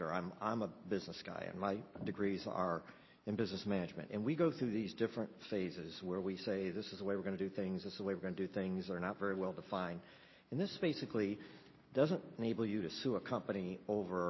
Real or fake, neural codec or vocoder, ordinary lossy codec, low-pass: real; none; MP3, 24 kbps; 7.2 kHz